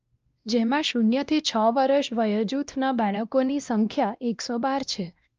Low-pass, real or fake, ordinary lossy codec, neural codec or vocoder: 7.2 kHz; fake; Opus, 24 kbps; codec, 16 kHz, 1 kbps, X-Codec, WavLM features, trained on Multilingual LibriSpeech